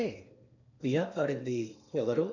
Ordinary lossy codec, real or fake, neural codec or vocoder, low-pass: none; fake; codec, 16 kHz in and 24 kHz out, 0.8 kbps, FocalCodec, streaming, 65536 codes; 7.2 kHz